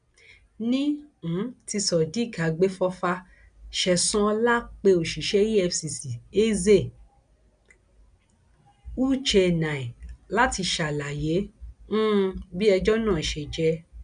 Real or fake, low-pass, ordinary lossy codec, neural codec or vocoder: real; 9.9 kHz; none; none